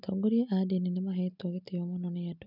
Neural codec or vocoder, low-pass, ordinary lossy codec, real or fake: none; 5.4 kHz; none; real